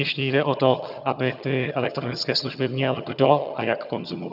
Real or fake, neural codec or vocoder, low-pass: fake; vocoder, 22.05 kHz, 80 mel bands, HiFi-GAN; 5.4 kHz